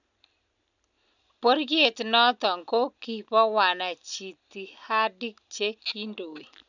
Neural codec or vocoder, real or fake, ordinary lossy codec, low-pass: none; real; none; 7.2 kHz